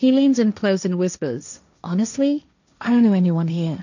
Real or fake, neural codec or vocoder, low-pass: fake; codec, 16 kHz, 1.1 kbps, Voila-Tokenizer; 7.2 kHz